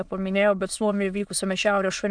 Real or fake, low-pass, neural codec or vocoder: fake; 9.9 kHz; autoencoder, 22.05 kHz, a latent of 192 numbers a frame, VITS, trained on many speakers